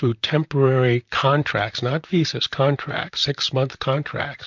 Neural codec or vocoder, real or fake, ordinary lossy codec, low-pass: vocoder, 44.1 kHz, 128 mel bands, Pupu-Vocoder; fake; MP3, 64 kbps; 7.2 kHz